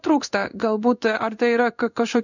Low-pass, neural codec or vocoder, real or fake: 7.2 kHz; codec, 16 kHz in and 24 kHz out, 1 kbps, XY-Tokenizer; fake